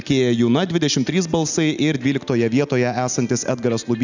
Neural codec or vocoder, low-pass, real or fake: none; 7.2 kHz; real